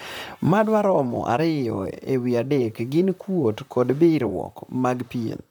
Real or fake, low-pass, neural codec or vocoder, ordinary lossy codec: fake; none; vocoder, 44.1 kHz, 128 mel bands, Pupu-Vocoder; none